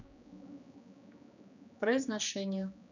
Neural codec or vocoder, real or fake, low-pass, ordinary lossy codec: codec, 16 kHz, 2 kbps, X-Codec, HuBERT features, trained on general audio; fake; 7.2 kHz; none